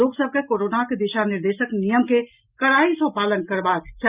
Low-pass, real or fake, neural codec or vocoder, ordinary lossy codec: 3.6 kHz; real; none; Opus, 64 kbps